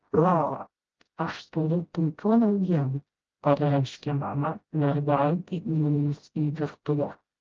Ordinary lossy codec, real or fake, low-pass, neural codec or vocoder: Opus, 24 kbps; fake; 7.2 kHz; codec, 16 kHz, 0.5 kbps, FreqCodec, smaller model